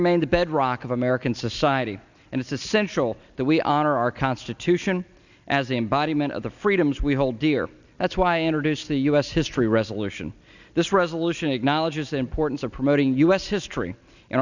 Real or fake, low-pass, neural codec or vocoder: real; 7.2 kHz; none